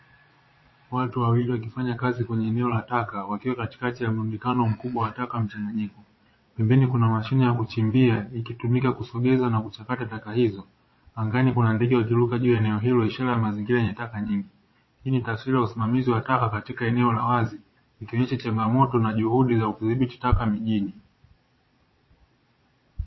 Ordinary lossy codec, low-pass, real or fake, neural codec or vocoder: MP3, 24 kbps; 7.2 kHz; fake; vocoder, 22.05 kHz, 80 mel bands, Vocos